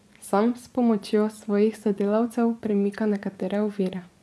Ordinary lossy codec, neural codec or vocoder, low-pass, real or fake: none; vocoder, 24 kHz, 100 mel bands, Vocos; none; fake